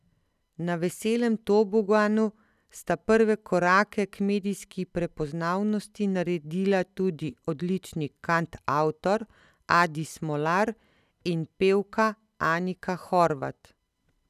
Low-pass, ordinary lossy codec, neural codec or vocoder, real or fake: 14.4 kHz; none; none; real